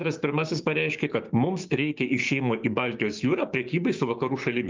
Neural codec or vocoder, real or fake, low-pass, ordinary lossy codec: codec, 16 kHz, 6 kbps, DAC; fake; 7.2 kHz; Opus, 24 kbps